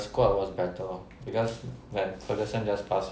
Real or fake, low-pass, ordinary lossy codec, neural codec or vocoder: real; none; none; none